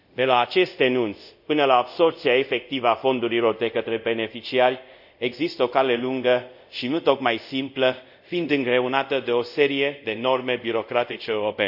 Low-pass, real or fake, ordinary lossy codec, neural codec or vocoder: 5.4 kHz; fake; none; codec, 24 kHz, 0.5 kbps, DualCodec